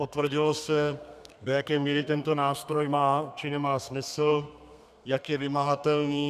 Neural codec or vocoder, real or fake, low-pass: codec, 32 kHz, 1.9 kbps, SNAC; fake; 14.4 kHz